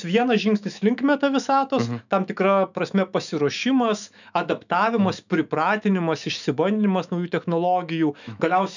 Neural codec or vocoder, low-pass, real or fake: autoencoder, 48 kHz, 128 numbers a frame, DAC-VAE, trained on Japanese speech; 7.2 kHz; fake